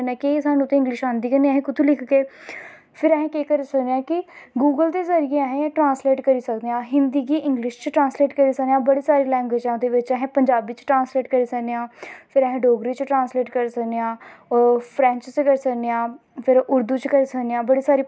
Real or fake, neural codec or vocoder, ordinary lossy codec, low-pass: real; none; none; none